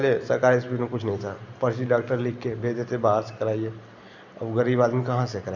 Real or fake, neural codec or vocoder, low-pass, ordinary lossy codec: real; none; 7.2 kHz; none